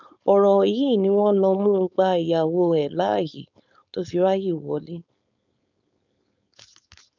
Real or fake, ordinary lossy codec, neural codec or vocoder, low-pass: fake; none; codec, 16 kHz, 4.8 kbps, FACodec; 7.2 kHz